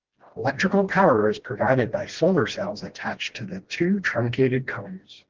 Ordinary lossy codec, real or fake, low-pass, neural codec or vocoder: Opus, 16 kbps; fake; 7.2 kHz; codec, 16 kHz, 1 kbps, FreqCodec, smaller model